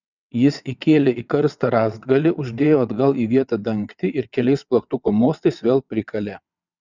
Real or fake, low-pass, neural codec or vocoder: fake; 7.2 kHz; vocoder, 22.05 kHz, 80 mel bands, WaveNeXt